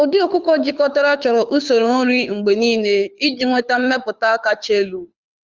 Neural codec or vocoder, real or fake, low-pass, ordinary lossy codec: codec, 24 kHz, 6 kbps, HILCodec; fake; 7.2 kHz; Opus, 32 kbps